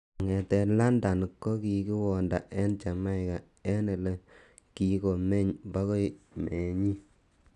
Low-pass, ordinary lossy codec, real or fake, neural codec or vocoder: 10.8 kHz; none; real; none